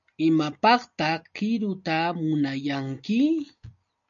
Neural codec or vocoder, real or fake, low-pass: none; real; 7.2 kHz